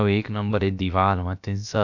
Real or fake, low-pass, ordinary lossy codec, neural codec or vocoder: fake; 7.2 kHz; none; codec, 16 kHz, about 1 kbps, DyCAST, with the encoder's durations